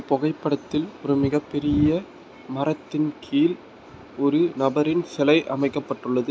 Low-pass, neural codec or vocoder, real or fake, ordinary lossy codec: none; none; real; none